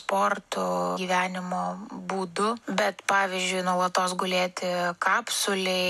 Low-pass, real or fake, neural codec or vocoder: 14.4 kHz; real; none